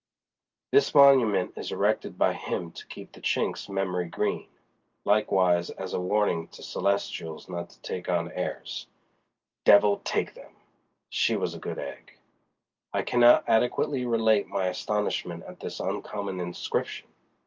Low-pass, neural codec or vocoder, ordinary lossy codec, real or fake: 7.2 kHz; none; Opus, 24 kbps; real